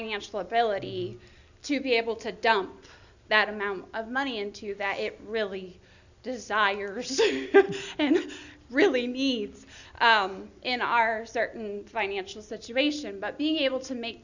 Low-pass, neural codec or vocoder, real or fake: 7.2 kHz; none; real